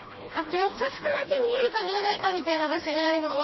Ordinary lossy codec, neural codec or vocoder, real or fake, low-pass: MP3, 24 kbps; codec, 16 kHz, 1 kbps, FreqCodec, smaller model; fake; 7.2 kHz